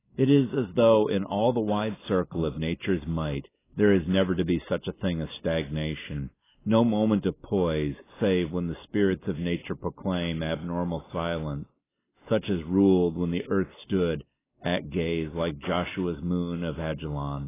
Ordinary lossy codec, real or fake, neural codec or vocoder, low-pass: AAC, 16 kbps; real; none; 3.6 kHz